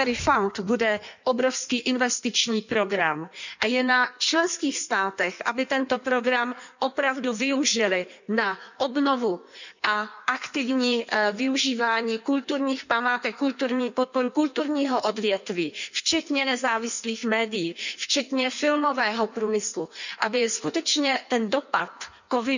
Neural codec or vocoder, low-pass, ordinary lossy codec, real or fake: codec, 16 kHz in and 24 kHz out, 1.1 kbps, FireRedTTS-2 codec; 7.2 kHz; none; fake